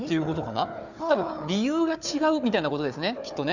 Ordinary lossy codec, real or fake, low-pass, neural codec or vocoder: none; fake; 7.2 kHz; codec, 16 kHz, 4 kbps, FunCodec, trained on Chinese and English, 50 frames a second